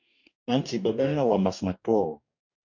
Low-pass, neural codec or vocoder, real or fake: 7.2 kHz; codec, 44.1 kHz, 2.6 kbps, DAC; fake